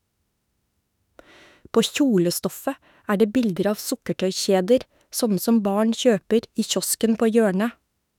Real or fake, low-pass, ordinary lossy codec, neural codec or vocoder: fake; 19.8 kHz; none; autoencoder, 48 kHz, 32 numbers a frame, DAC-VAE, trained on Japanese speech